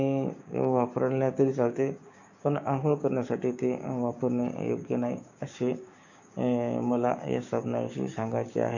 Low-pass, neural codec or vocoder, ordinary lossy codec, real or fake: 7.2 kHz; codec, 16 kHz, 6 kbps, DAC; none; fake